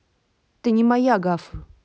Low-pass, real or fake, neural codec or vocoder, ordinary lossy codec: none; real; none; none